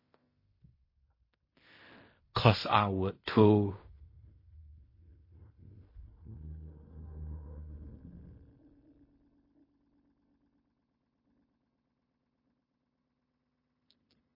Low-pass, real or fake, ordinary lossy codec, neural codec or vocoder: 5.4 kHz; fake; MP3, 24 kbps; codec, 16 kHz in and 24 kHz out, 0.4 kbps, LongCat-Audio-Codec, fine tuned four codebook decoder